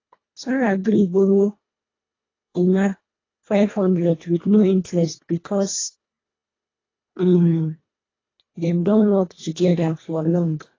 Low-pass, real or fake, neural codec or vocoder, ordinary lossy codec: 7.2 kHz; fake; codec, 24 kHz, 1.5 kbps, HILCodec; AAC, 32 kbps